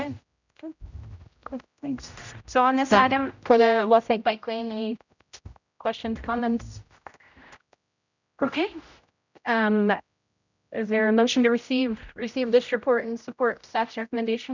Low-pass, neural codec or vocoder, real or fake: 7.2 kHz; codec, 16 kHz, 0.5 kbps, X-Codec, HuBERT features, trained on general audio; fake